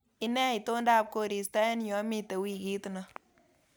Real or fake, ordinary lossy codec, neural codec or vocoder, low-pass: real; none; none; none